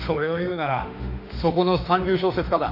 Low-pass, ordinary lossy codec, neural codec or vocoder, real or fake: 5.4 kHz; none; autoencoder, 48 kHz, 32 numbers a frame, DAC-VAE, trained on Japanese speech; fake